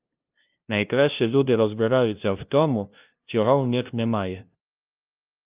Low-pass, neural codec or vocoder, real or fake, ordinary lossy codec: 3.6 kHz; codec, 16 kHz, 0.5 kbps, FunCodec, trained on LibriTTS, 25 frames a second; fake; Opus, 24 kbps